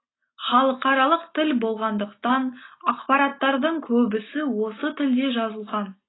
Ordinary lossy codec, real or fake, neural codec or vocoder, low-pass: AAC, 16 kbps; real; none; 7.2 kHz